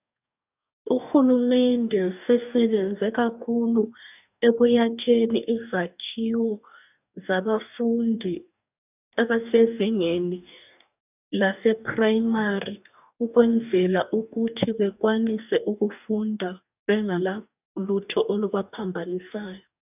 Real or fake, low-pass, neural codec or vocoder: fake; 3.6 kHz; codec, 44.1 kHz, 2.6 kbps, DAC